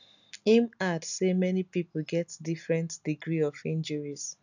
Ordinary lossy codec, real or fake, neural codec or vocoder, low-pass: none; real; none; 7.2 kHz